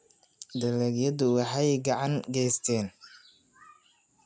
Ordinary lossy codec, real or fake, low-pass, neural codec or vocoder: none; real; none; none